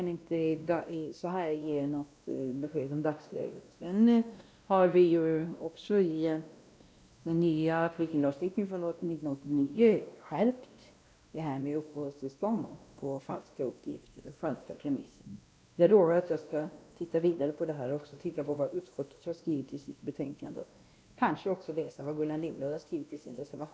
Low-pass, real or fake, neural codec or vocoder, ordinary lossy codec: none; fake; codec, 16 kHz, 1 kbps, X-Codec, WavLM features, trained on Multilingual LibriSpeech; none